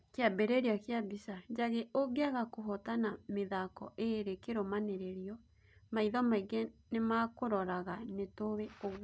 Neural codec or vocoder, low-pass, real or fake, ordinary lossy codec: none; none; real; none